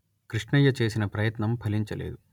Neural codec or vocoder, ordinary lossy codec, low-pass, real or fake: none; none; 19.8 kHz; real